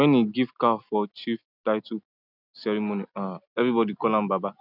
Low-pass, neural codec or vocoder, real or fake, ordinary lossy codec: 5.4 kHz; none; real; none